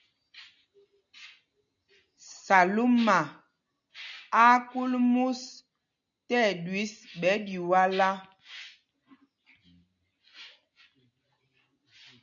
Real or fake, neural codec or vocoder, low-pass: real; none; 7.2 kHz